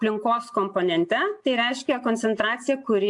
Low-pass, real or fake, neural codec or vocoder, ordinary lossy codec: 10.8 kHz; real; none; AAC, 64 kbps